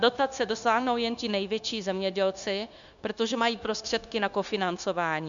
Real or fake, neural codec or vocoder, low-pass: fake; codec, 16 kHz, 0.9 kbps, LongCat-Audio-Codec; 7.2 kHz